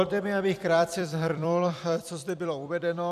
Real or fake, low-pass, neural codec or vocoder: fake; 14.4 kHz; vocoder, 44.1 kHz, 128 mel bands every 256 samples, BigVGAN v2